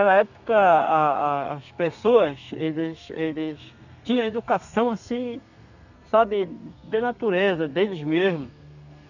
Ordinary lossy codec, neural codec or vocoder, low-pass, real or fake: none; codec, 32 kHz, 1.9 kbps, SNAC; 7.2 kHz; fake